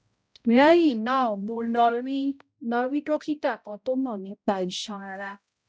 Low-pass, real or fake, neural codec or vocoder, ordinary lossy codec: none; fake; codec, 16 kHz, 0.5 kbps, X-Codec, HuBERT features, trained on balanced general audio; none